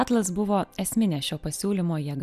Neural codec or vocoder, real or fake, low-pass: none; real; 14.4 kHz